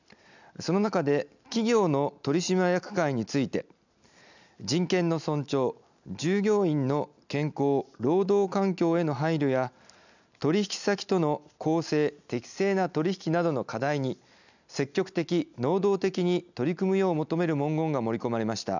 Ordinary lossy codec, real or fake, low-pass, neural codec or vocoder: none; real; 7.2 kHz; none